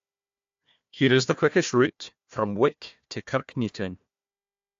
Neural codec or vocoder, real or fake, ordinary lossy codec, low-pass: codec, 16 kHz, 1 kbps, FunCodec, trained on Chinese and English, 50 frames a second; fake; AAC, 64 kbps; 7.2 kHz